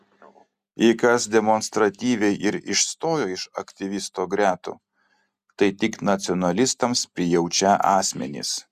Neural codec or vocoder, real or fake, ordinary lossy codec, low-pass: none; real; Opus, 64 kbps; 14.4 kHz